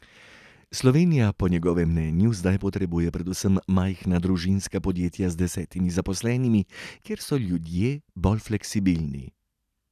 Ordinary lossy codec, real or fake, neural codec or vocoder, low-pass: none; real; none; 14.4 kHz